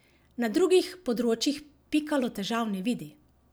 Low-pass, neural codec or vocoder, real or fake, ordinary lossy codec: none; none; real; none